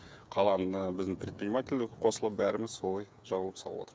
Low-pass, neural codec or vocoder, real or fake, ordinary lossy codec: none; codec, 16 kHz, 8 kbps, FreqCodec, smaller model; fake; none